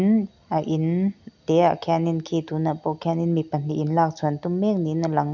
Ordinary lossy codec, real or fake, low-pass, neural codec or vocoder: none; real; 7.2 kHz; none